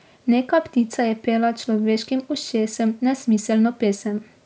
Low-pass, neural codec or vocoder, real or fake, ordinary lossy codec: none; none; real; none